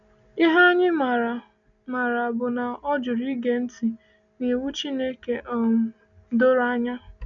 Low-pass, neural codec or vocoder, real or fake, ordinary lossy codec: 7.2 kHz; none; real; none